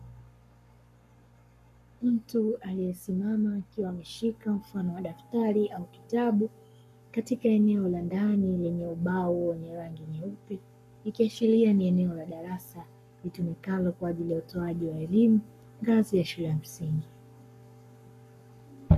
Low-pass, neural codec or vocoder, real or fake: 14.4 kHz; codec, 44.1 kHz, 7.8 kbps, Pupu-Codec; fake